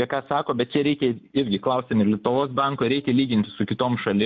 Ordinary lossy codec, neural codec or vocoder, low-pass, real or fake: MP3, 64 kbps; none; 7.2 kHz; real